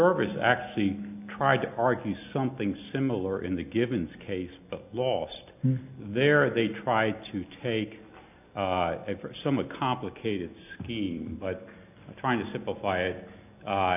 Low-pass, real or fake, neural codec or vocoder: 3.6 kHz; real; none